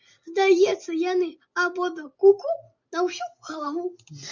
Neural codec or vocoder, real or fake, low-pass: none; real; 7.2 kHz